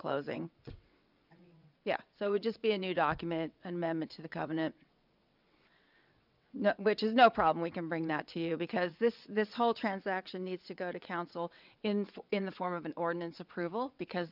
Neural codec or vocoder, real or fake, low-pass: vocoder, 22.05 kHz, 80 mel bands, WaveNeXt; fake; 5.4 kHz